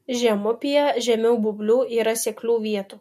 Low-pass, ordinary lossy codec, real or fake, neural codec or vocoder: 14.4 kHz; MP3, 64 kbps; real; none